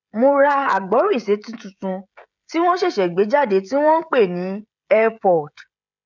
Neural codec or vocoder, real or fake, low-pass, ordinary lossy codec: codec, 16 kHz, 16 kbps, FreqCodec, smaller model; fake; 7.2 kHz; none